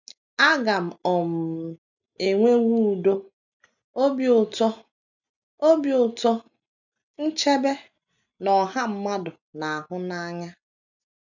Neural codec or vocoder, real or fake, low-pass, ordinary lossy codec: none; real; 7.2 kHz; none